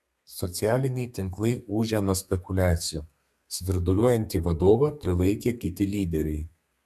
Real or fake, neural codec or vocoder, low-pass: fake; codec, 32 kHz, 1.9 kbps, SNAC; 14.4 kHz